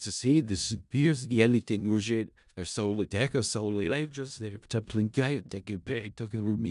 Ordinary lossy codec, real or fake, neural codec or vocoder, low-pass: MP3, 96 kbps; fake; codec, 16 kHz in and 24 kHz out, 0.4 kbps, LongCat-Audio-Codec, four codebook decoder; 10.8 kHz